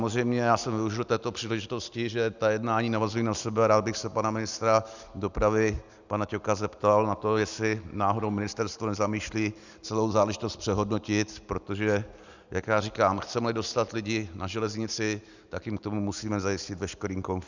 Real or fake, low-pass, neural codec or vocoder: real; 7.2 kHz; none